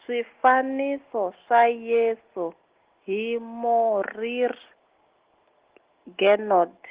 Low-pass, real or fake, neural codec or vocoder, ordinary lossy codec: 3.6 kHz; real; none; Opus, 16 kbps